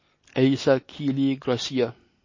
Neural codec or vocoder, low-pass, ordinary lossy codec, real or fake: none; 7.2 kHz; MP3, 32 kbps; real